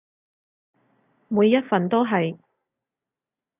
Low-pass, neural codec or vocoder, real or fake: 3.6 kHz; none; real